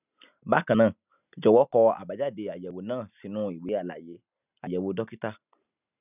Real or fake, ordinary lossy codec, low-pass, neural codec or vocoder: real; none; 3.6 kHz; none